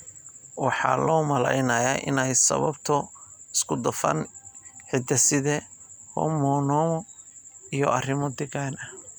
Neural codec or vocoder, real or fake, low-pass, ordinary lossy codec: none; real; none; none